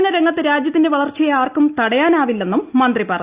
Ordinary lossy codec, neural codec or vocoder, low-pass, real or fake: Opus, 64 kbps; none; 3.6 kHz; real